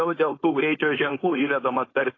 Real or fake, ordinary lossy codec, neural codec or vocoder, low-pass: fake; AAC, 32 kbps; codec, 16 kHz, 4.8 kbps, FACodec; 7.2 kHz